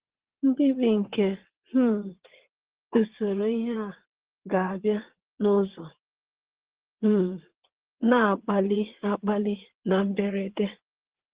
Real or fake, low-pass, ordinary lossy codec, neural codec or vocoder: fake; 3.6 kHz; Opus, 16 kbps; vocoder, 22.05 kHz, 80 mel bands, WaveNeXt